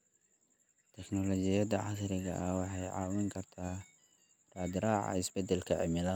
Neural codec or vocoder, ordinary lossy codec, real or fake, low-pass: none; none; real; none